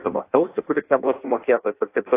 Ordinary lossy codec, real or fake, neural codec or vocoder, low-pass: AAC, 24 kbps; fake; codec, 16 kHz in and 24 kHz out, 0.6 kbps, FireRedTTS-2 codec; 3.6 kHz